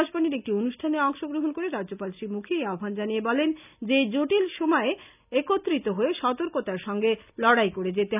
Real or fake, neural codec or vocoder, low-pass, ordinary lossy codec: real; none; 3.6 kHz; none